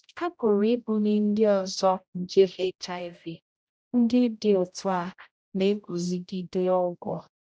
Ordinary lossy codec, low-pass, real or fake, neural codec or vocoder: none; none; fake; codec, 16 kHz, 0.5 kbps, X-Codec, HuBERT features, trained on general audio